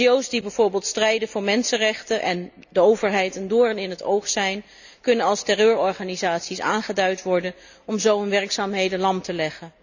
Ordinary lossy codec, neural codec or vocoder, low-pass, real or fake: none; none; 7.2 kHz; real